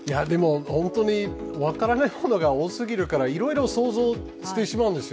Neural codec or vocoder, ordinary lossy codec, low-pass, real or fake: none; none; none; real